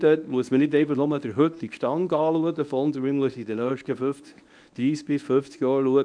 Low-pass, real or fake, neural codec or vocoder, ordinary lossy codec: 9.9 kHz; fake; codec, 24 kHz, 0.9 kbps, WavTokenizer, medium speech release version 1; none